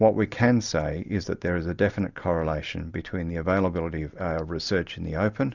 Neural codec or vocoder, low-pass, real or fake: none; 7.2 kHz; real